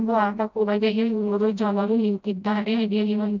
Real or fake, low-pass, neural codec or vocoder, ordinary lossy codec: fake; 7.2 kHz; codec, 16 kHz, 0.5 kbps, FreqCodec, smaller model; none